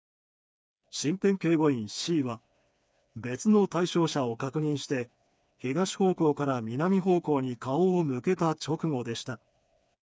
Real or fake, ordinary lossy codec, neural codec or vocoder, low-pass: fake; none; codec, 16 kHz, 4 kbps, FreqCodec, smaller model; none